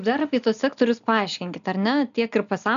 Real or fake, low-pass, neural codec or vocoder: real; 7.2 kHz; none